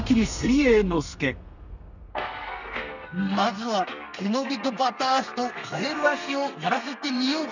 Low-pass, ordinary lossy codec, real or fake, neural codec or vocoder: 7.2 kHz; none; fake; codec, 32 kHz, 1.9 kbps, SNAC